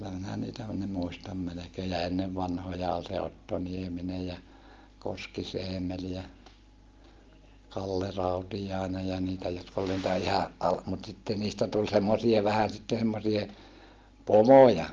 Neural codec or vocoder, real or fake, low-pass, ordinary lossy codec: none; real; 7.2 kHz; Opus, 32 kbps